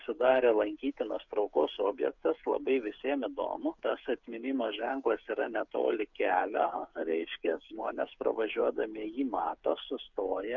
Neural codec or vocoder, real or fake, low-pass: codec, 16 kHz, 8 kbps, FreqCodec, smaller model; fake; 7.2 kHz